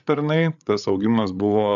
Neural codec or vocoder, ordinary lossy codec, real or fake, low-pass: codec, 16 kHz, 8 kbps, FreqCodec, larger model; MP3, 96 kbps; fake; 7.2 kHz